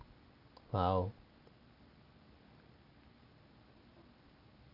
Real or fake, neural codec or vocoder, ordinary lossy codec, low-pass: real; none; none; 5.4 kHz